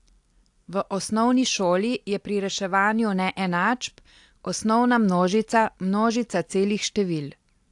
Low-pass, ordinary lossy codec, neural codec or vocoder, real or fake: 10.8 kHz; AAC, 64 kbps; none; real